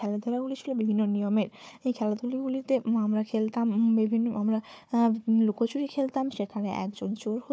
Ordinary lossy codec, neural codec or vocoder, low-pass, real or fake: none; codec, 16 kHz, 4 kbps, FunCodec, trained on Chinese and English, 50 frames a second; none; fake